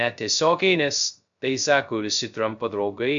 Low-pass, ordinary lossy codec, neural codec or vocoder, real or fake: 7.2 kHz; MP3, 64 kbps; codec, 16 kHz, 0.2 kbps, FocalCodec; fake